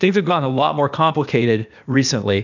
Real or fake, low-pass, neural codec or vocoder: fake; 7.2 kHz; codec, 16 kHz, 0.8 kbps, ZipCodec